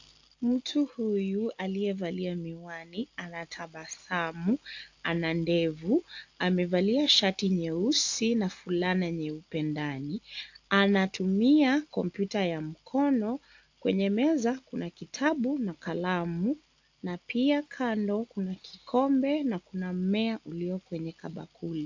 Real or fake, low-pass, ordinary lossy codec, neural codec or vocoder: real; 7.2 kHz; AAC, 48 kbps; none